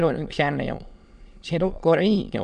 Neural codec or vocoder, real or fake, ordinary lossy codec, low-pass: autoencoder, 22.05 kHz, a latent of 192 numbers a frame, VITS, trained on many speakers; fake; none; 9.9 kHz